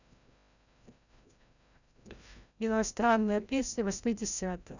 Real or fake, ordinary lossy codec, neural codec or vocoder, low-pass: fake; none; codec, 16 kHz, 0.5 kbps, FreqCodec, larger model; 7.2 kHz